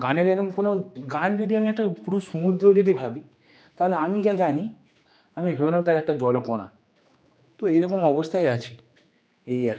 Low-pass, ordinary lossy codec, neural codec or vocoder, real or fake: none; none; codec, 16 kHz, 2 kbps, X-Codec, HuBERT features, trained on general audio; fake